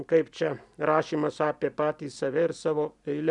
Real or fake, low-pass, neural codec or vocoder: real; 10.8 kHz; none